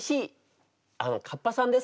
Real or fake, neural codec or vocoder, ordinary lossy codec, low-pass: real; none; none; none